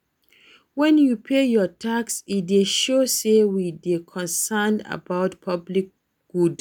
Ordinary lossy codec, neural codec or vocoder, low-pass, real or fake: none; none; none; real